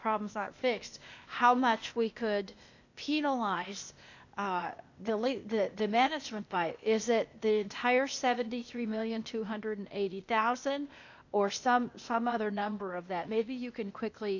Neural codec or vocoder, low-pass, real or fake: codec, 16 kHz, 0.8 kbps, ZipCodec; 7.2 kHz; fake